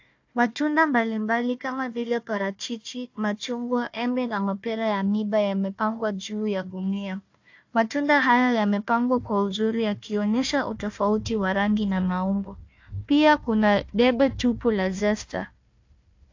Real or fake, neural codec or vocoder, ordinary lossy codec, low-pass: fake; codec, 16 kHz, 1 kbps, FunCodec, trained on Chinese and English, 50 frames a second; AAC, 48 kbps; 7.2 kHz